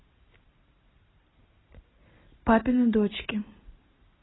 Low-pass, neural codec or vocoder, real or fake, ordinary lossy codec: 7.2 kHz; none; real; AAC, 16 kbps